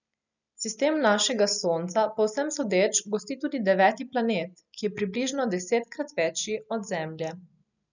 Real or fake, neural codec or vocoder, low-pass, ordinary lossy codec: real; none; 7.2 kHz; none